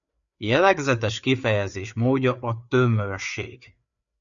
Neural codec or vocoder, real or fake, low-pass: codec, 16 kHz, 4 kbps, FreqCodec, larger model; fake; 7.2 kHz